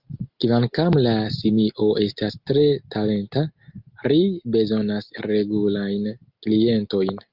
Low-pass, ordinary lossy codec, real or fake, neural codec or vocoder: 5.4 kHz; Opus, 32 kbps; real; none